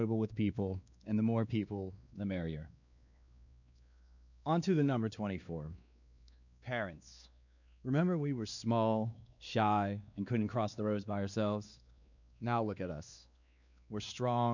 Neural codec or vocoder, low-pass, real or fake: codec, 16 kHz, 2 kbps, X-Codec, WavLM features, trained on Multilingual LibriSpeech; 7.2 kHz; fake